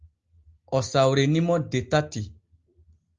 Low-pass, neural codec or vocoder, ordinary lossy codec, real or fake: 7.2 kHz; none; Opus, 32 kbps; real